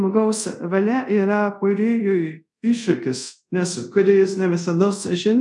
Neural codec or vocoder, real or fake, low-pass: codec, 24 kHz, 0.5 kbps, DualCodec; fake; 10.8 kHz